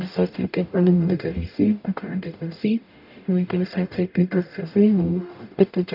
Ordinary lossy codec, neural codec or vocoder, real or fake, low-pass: none; codec, 44.1 kHz, 0.9 kbps, DAC; fake; 5.4 kHz